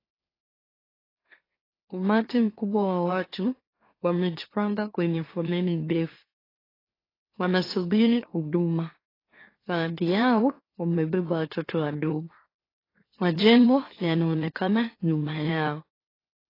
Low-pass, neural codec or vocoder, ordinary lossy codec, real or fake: 5.4 kHz; autoencoder, 44.1 kHz, a latent of 192 numbers a frame, MeloTTS; AAC, 24 kbps; fake